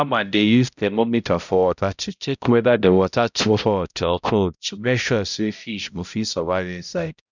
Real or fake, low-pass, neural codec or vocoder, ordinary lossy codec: fake; 7.2 kHz; codec, 16 kHz, 0.5 kbps, X-Codec, HuBERT features, trained on balanced general audio; none